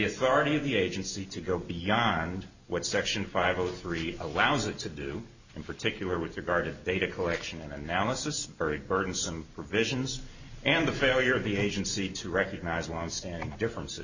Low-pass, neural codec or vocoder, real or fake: 7.2 kHz; none; real